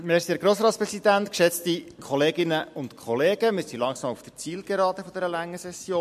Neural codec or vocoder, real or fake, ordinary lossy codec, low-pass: none; real; MP3, 64 kbps; 14.4 kHz